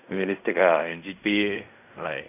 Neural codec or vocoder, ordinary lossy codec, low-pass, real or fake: codec, 16 kHz in and 24 kHz out, 0.4 kbps, LongCat-Audio-Codec, fine tuned four codebook decoder; none; 3.6 kHz; fake